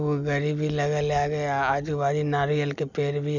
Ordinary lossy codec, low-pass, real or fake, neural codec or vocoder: none; 7.2 kHz; real; none